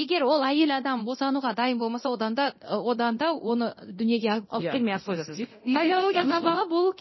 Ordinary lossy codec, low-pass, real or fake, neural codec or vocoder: MP3, 24 kbps; 7.2 kHz; fake; codec, 24 kHz, 0.9 kbps, DualCodec